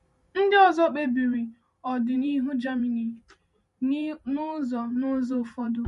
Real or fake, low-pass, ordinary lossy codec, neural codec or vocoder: fake; 14.4 kHz; MP3, 48 kbps; vocoder, 44.1 kHz, 128 mel bands every 512 samples, BigVGAN v2